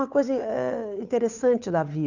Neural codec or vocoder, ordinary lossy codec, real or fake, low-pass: codec, 16 kHz, 8 kbps, FunCodec, trained on Chinese and English, 25 frames a second; none; fake; 7.2 kHz